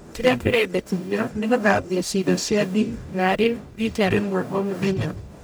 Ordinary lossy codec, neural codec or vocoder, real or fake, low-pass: none; codec, 44.1 kHz, 0.9 kbps, DAC; fake; none